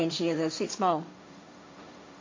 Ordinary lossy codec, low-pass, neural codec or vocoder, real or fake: MP3, 48 kbps; 7.2 kHz; codec, 16 kHz, 1.1 kbps, Voila-Tokenizer; fake